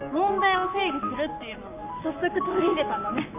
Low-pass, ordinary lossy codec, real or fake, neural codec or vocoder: 3.6 kHz; MP3, 32 kbps; fake; codec, 44.1 kHz, 7.8 kbps, Pupu-Codec